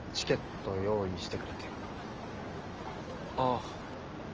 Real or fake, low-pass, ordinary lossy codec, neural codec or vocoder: real; 7.2 kHz; Opus, 24 kbps; none